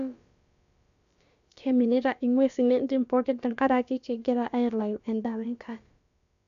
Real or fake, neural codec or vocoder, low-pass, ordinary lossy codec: fake; codec, 16 kHz, about 1 kbps, DyCAST, with the encoder's durations; 7.2 kHz; none